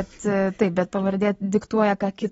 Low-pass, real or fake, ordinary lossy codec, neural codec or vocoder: 19.8 kHz; real; AAC, 24 kbps; none